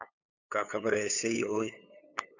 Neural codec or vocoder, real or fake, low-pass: codec, 16 kHz, 8 kbps, FunCodec, trained on LibriTTS, 25 frames a second; fake; 7.2 kHz